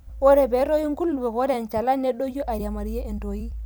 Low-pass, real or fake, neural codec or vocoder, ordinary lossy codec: none; fake; vocoder, 44.1 kHz, 128 mel bands every 256 samples, BigVGAN v2; none